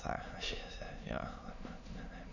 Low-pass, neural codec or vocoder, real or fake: 7.2 kHz; autoencoder, 22.05 kHz, a latent of 192 numbers a frame, VITS, trained on many speakers; fake